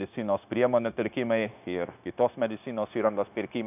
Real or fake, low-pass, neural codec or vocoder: fake; 3.6 kHz; codec, 16 kHz, 0.9 kbps, LongCat-Audio-Codec